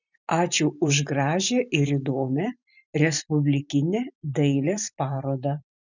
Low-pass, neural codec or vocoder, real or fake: 7.2 kHz; vocoder, 44.1 kHz, 128 mel bands every 512 samples, BigVGAN v2; fake